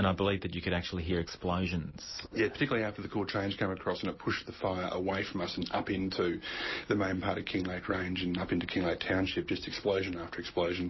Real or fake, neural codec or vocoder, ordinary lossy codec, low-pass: real; none; MP3, 24 kbps; 7.2 kHz